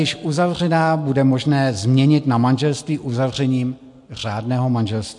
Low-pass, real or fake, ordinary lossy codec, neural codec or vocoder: 10.8 kHz; real; MP3, 64 kbps; none